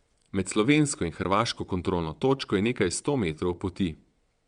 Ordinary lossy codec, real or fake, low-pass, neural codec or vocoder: Opus, 64 kbps; fake; 9.9 kHz; vocoder, 22.05 kHz, 80 mel bands, WaveNeXt